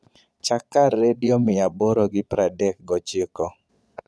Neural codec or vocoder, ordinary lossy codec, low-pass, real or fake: vocoder, 22.05 kHz, 80 mel bands, Vocos; none; none; fake